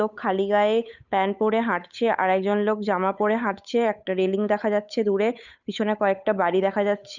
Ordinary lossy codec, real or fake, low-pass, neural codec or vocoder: none; fake; 7.2 kHz; codec, 16 kHz, 8 kbps, FunCodec, trained on Chinese and English, 25 frames a second